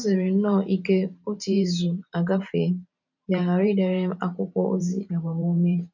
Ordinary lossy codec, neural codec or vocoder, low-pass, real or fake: none; vocoder, 44.1 kHz, 128 mel bands every 512 samples, BigVGAN v2; 7.2 kHz; fake